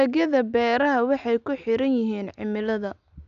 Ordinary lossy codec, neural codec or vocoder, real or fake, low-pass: none; none; real; 7.2 kHz